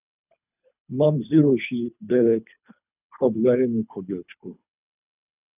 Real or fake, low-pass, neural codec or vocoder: fake; 3.6 kHz; codec, 24 kHz, 3 kbps, HILCodec